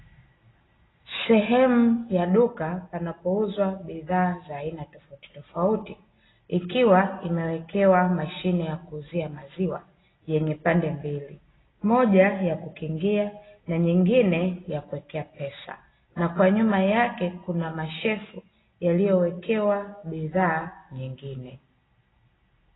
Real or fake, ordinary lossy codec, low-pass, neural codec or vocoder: real; AAC, 16 kbps; 7.2 kHz; none